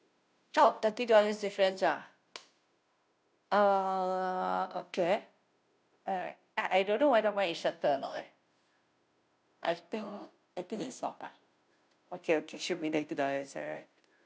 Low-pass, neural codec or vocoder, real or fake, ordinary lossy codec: none; codec, 16 kHz, 0.5 kbps, FunCodec, trained on Chinese and English, 25 frames a second; fake; none